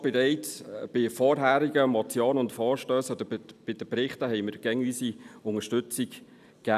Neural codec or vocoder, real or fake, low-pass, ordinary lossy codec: none; real; 14.4 kHz; none